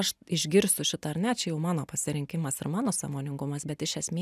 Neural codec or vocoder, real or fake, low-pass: none; real; 14.4 kHz